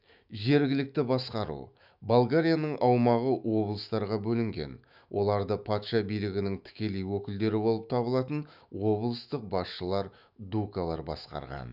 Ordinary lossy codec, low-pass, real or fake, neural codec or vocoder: none; 5.4 kHz; fake; autoencoder, 48 kHz, 128 numbers a frame, DAC-VAE, trained on Japanese speech